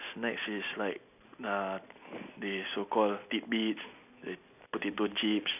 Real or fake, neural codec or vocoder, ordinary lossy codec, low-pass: real; none; none; 3.6 kHz